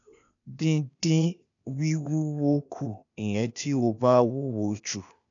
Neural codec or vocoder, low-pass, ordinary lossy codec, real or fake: codec, 16 kHz, 0.8 kbps, ZipCodec; 7.2 kHz; none; fake